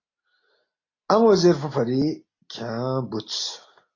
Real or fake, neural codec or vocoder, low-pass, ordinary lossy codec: real; none; 7.2 kHz; AAC, 32 kbps